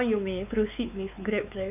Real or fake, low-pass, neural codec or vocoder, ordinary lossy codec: fake; 3.6 kHz; codec, 16 kHz, 4 kbps, X-Codec, WavLM features, trained on Multilingual LibriSpeech; none